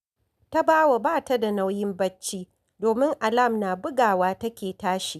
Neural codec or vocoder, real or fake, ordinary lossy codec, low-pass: none; real; none; 14.4 kHz